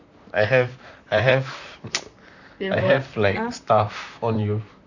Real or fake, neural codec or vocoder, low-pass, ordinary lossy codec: fake; vocoder, 44.1 kHz, 128 mel bands, Pupu-Vocoder; 7.2 kHz; none